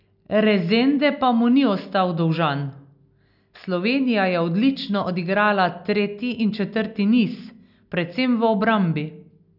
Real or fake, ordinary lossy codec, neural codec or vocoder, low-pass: real; none; none; 5.4 kHz